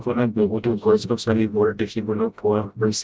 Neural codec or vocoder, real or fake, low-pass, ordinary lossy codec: codec, 16 kHz, 0.5 kbps, FreqCodec, smaller model; fake; none; none